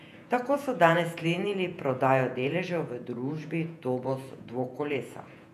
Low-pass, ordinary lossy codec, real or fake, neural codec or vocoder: 14.4 kHz; none; fake; vocoder, 48 kHz, 128 mel bands, Vocos